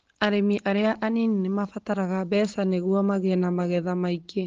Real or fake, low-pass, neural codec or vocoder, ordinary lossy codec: real; 7.2 kHz; none; Opus, 16 kbps